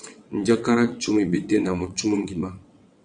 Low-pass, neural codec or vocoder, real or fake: 9.9 kHz; vocoder, 22.05 kHz, 80 mel bands, WaveNeXt; fake